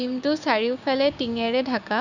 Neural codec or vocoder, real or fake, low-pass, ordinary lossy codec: codec, 16 kHz, 6 kbps, DAC; fake; 7.2 kHz; none